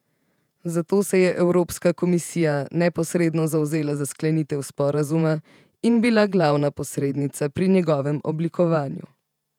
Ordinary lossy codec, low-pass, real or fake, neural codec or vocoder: none; 19.8 kHz; fake; vocoder, 48 kHz, 128 mel bands, Vocos